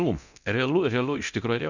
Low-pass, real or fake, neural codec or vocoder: 7.2 kHz; fake; codec, 24 kHz, 0.9 kbps, DualCodec